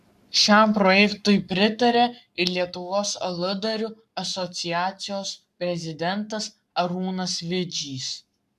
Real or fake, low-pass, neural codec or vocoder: fake; 14.4 kHz; codec, 44.1 kHz, 7.8 kbps, Pupu-Codec